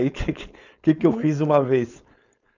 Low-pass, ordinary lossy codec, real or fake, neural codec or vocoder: 7.2 kHz; none; fake; codec, 16 kHz, 4.8 kbps, FACodec